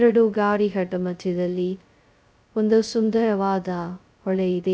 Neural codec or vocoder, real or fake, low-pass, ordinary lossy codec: codec, 16 kHz, 0.2 kbps, FocalCodec; fake; none; none